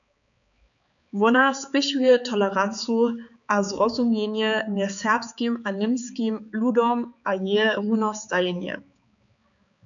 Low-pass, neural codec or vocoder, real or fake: 7.2 kHz; codec, 16 kHz, 4 kbps, X-Codec, HuBERT features, trained on balanced general audio; fake